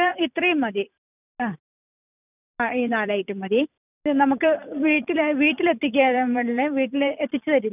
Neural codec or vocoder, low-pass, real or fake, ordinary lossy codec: vocoder, 44.1 kHz, 128 mel bands every 256 samples, BigVGAN v2; 3.6 kHz; fake; none